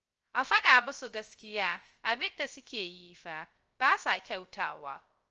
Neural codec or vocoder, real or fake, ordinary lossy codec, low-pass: codec, 16 kHz, 0.3 kbps, FocalCodec; fake; Opus, 24 kbps; 7.2 kHz